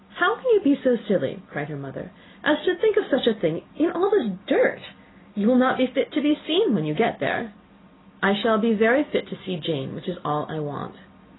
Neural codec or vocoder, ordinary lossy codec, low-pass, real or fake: none; AAC, 16 kbps; 7.2 kHz; real